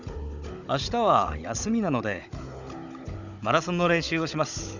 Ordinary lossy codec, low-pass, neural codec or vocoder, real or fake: none; 7.2 kHz; codec, 16 kHz, 16 kbps, FunCodec, trained on Chinese and English, 50 frames a second; fake